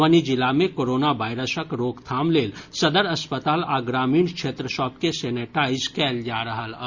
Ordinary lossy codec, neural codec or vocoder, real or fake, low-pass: Opus, 64 kbps; none; real; 7.2 kHz